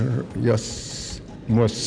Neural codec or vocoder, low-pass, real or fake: none; 9.9 kHz; real